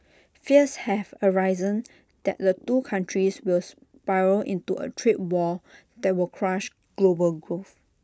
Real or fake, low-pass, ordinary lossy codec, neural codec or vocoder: real; none; none; none